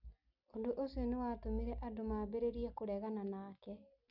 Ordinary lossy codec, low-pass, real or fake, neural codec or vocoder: none; 5.4 kHz; real; none